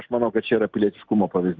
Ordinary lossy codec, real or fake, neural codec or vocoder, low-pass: Opus, 24 kbps; real; none; 7.2 kHz